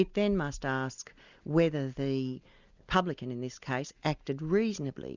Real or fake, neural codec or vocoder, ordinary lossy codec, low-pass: real; none; Opus, 64 kbps; 7.2 kHz